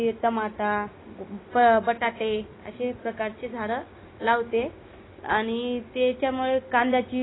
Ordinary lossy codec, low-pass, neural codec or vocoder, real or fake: AAC, 16 kbps; 7.2 kHz; none; real